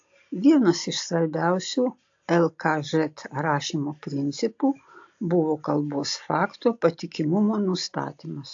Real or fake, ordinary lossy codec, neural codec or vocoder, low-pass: real; AAC, 48 kbps; none; 7.2 kHz